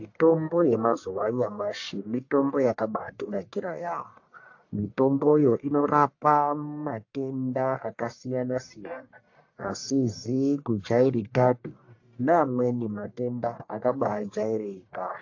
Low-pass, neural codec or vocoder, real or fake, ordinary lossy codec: 7.2 kHz; codec, 44.1 kHz, 1.7 kbps, Pupu-Codec; fake; AAC, 48 kbps